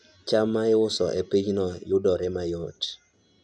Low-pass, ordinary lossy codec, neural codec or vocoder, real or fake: none; none; none; real